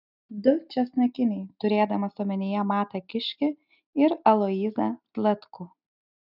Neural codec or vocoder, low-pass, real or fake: none; 5.4 kHz; real